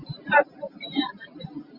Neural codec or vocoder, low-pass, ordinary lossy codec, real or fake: none; 5.4 kHz; Opus, 64 kbps; real